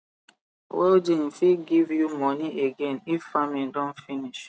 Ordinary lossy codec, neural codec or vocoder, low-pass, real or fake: none; none; none; real